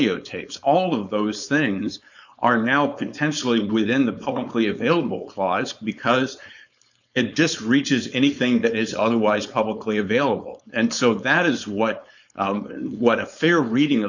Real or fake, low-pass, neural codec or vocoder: fake; 7.2 kHz; codec, 16 kHz, 4.8 kbps, FACodec